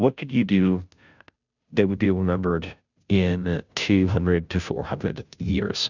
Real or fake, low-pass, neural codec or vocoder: fake; 7.2 kHz; codec, 16 kHz, 0.5 kbps, FunCodec, trained on Chinese and English, 25 frames a second